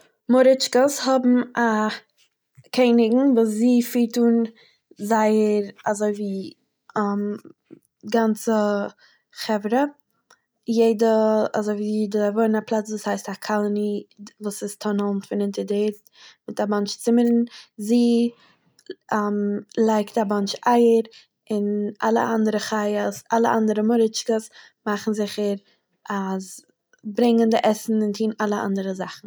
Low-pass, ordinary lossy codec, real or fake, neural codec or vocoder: none; none; real; none